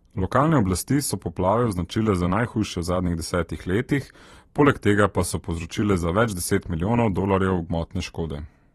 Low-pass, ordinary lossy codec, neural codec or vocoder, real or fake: 19.8 kHz; AAC, 32 kbps; vocoder, 44.1 kHz, 128 mel bands every 512 samples, BigVGAN v2; fake